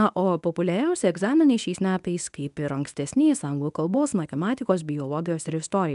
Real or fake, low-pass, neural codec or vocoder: fake; 10.8 kHz; codec, 24 kHz, 0.9 kbps, WavTokenizer, medium speech release version 1